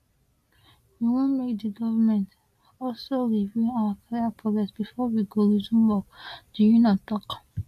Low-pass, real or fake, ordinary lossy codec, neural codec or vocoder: 14.4 kHz; real; AAC, 64 kbps; none